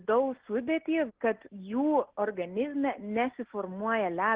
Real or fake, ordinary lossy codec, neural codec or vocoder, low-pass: real; Opus, 16 kbps; none; 3.6 kHz